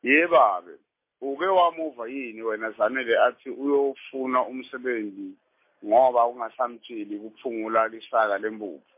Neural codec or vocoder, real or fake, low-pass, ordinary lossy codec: none; real; 3.6 kHz; MP3, 24 kbps